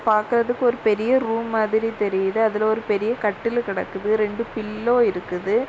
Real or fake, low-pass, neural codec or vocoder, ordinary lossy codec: real; none; none; none